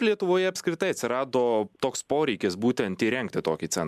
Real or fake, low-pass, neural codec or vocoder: real; 14.4 kHz; none